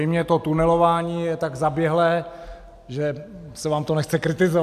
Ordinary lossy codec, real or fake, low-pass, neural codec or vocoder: AAC, 96 kbps; real; 14.4 kHz; none